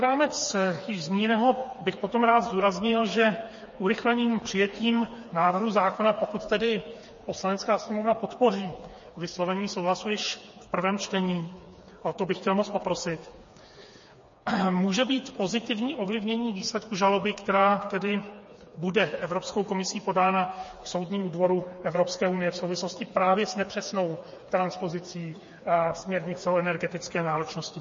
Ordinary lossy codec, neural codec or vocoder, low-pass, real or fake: MP3, 32 kbps; codec, 16 kHz, 4 kbps, FreqCodec, smaller model; 7.2 kHz; fake